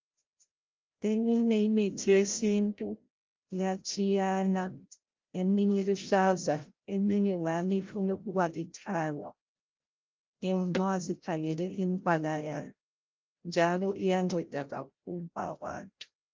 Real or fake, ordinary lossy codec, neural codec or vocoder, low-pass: fake; Opus, 32 kbps; codec, 16 kHz, 0.5 kbps, FreqCodec, larger model; 7.2 kHz